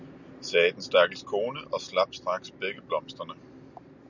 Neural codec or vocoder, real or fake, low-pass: none; real; 7.2 kHz